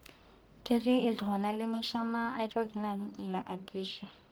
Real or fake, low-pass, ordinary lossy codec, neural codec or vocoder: fake; none; none; codec, 44.1 kHz, 1.7 kbps, Pupu-Codec